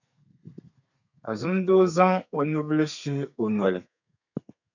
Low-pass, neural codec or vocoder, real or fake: 7.2 kHz; codec, 32 kHz, 1.9 kbps, SNAC; fake